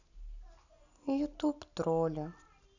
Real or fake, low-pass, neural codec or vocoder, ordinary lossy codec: real; 7.2 kHz; none; none